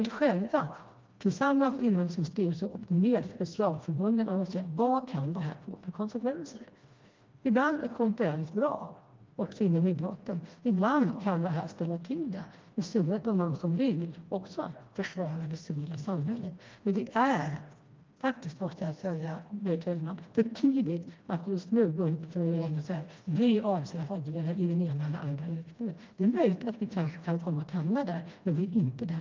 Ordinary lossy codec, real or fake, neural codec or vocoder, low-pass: Opus, 24 kbps; fake; codec, 16 kHz, 1 kbps, FreqCodec, smaller model; 7.2 kHz